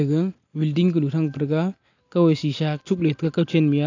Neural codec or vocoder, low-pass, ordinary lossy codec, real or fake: none; 7.2 kHz; AAC, 48 kbps; real